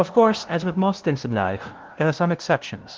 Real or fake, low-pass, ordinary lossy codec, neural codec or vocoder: fake; 7.2 kHz; Opus, 16 kbps; codec, 16 kHz, 0.5 kbps, FunCodec, trained on LibriTTS, 25 frames a second